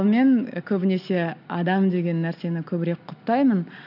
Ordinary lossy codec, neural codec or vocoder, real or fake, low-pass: none; none; real; 5.4 kHz